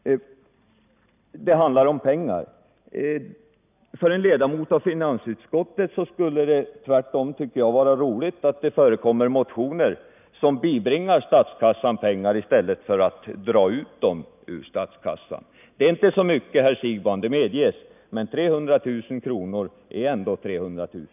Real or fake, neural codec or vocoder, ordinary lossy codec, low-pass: real; none; none; 3.6 kHz